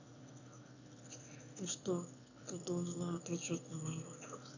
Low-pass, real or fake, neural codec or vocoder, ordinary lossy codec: 7.2 kHz; fake; autoencoder, 22.05 kHz, a latent of 192 numbers a frame, VITS, trained on one speaker; none